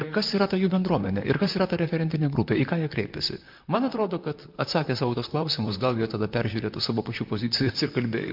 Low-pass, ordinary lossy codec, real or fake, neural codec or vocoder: 5.4 kHz; MP3, 48 kbps; fake; vocoder, 44.1 kHz, 128 mel bands, Pupu-Vocoder